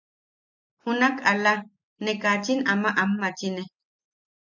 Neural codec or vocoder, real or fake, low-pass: none; real; 7.2 kHz